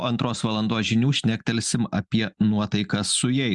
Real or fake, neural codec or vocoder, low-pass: real; none; 10.8 kHz